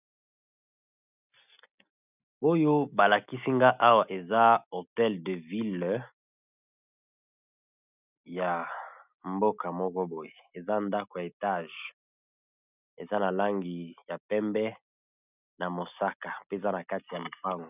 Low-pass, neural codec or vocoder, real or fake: 3.6 kHz; none; real